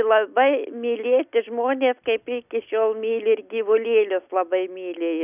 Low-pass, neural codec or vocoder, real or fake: 3.6 kHz; none; real